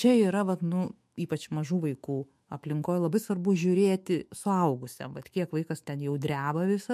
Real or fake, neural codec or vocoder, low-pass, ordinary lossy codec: fake; autoencoder, 48 kHz, 128 numbers a frame, DAC-VAE, trained on Japanese speech; 14.4 kHz; MP3, 64 kbps